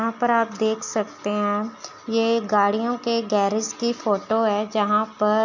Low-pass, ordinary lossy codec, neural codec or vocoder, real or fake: 7.2 kHz; none; none; real